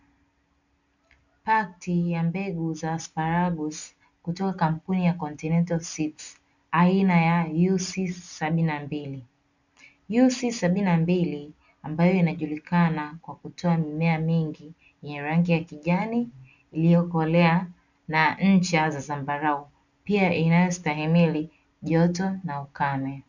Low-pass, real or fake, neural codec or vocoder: 7.2 kHz; real; none